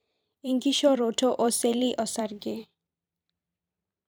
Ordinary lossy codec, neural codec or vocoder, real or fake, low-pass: none; vocoder, 44.1 kHz, 128 mel bands every 256 samples, BigVGAN v2; fake; none